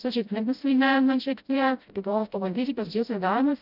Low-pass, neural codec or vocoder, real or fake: 5.4 kHz; codec, 16 kHz, 0.5 kbps, FreqCodec, smaller model; fake